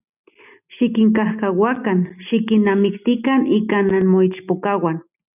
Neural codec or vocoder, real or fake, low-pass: none; real; 3.6 kHz